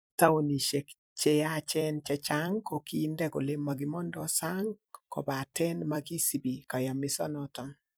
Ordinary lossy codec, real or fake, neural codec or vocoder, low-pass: none; fake; vocoder, 44.1 kHz, 128 mel bands every 256 samples, BigVGAN v2; none